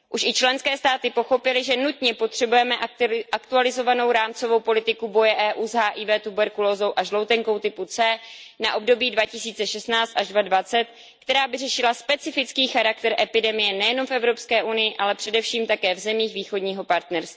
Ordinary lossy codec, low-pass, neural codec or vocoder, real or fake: none; none; none; real